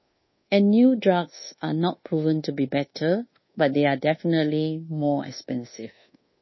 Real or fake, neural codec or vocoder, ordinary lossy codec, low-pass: fake; codec, 24 kHz, 1.2 kbps, DualCodec; MP3, 24 kbps; 7.2 kHz